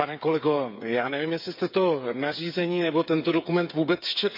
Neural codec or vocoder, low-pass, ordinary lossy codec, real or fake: codec, 16 kHz, 8 kbps, FreqCodec, smaller model; 5.4 kHz; MP3, 48 kbps; fake